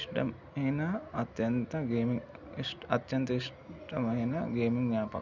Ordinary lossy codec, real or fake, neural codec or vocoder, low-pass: none; real; none; 7.2 kHz